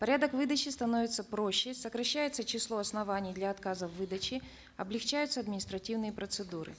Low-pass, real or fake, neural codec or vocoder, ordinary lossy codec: none; real; none; none